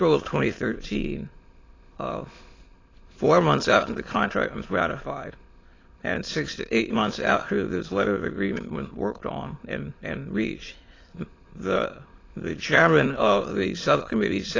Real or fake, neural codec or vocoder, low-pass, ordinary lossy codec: fake; autoencoder, 22.05 kHz, a latent of 192 numbers a frame, VITS, trained on many speakers; 7.2 kHz; AAC, 32 kbps